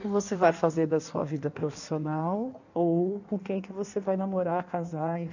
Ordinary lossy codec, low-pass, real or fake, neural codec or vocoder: none; 7.2 kHz; fake; codec, 16 kHz in and 24 kHz out, 1.1 kbps, FireRedTTS-2 codec